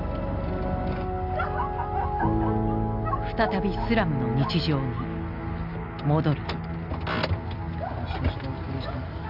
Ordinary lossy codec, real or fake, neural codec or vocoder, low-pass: none; real; none; 5.4 kHz